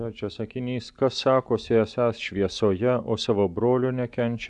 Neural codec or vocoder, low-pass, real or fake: none; 10.8 kHz; real